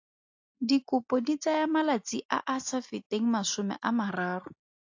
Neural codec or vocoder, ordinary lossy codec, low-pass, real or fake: none; AAC, 48 kbps; 7.2 kHz; real